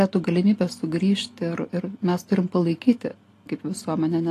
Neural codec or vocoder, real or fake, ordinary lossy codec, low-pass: none; real; AAC, 48 kbps; 14.4 kHz